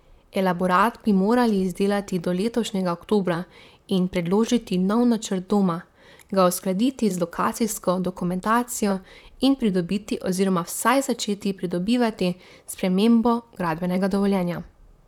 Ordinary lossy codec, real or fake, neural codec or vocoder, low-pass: none; fake; vocoder, 44.1 kHz, 128 mel bands, Pupu-Vocoder; 19.8 kHz